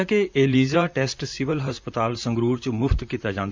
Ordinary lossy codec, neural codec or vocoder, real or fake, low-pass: MP3, 64 kbps; vocoder, 44.1 kHz, 128 mel bands, Pupu-Vocoder; fake; 7.2 kHz